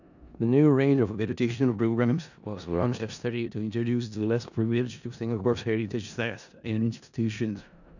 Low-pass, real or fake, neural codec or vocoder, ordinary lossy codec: 7.2 kHz; fake; codec, 16 kHz in and 24 kHz out, 0.4 kbps, LongCat-Audio-Codec, four codebook decoder; none